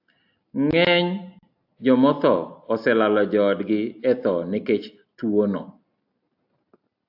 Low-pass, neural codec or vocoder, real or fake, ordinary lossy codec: 5.4 kHz; none; real; AAC, 48 kbps